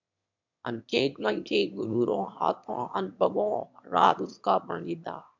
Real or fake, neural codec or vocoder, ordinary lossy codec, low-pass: fake; autoencoder, 22.05 kHz, a latent of 192 numbers a frame, VITS, trained on one speaker; MP3, 64 kbps; 7.2 kHz